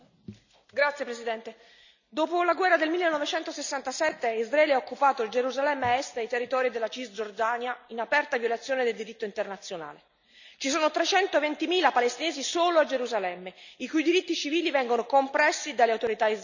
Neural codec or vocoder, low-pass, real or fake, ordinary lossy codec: none; 7.2 kHz; real; none